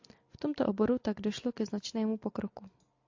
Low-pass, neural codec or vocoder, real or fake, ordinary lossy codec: 7.2 kHz; none; real; AAC, 48 kbps